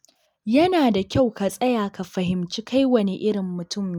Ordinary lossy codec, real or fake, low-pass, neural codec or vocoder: none; real; 19.8 kHz; none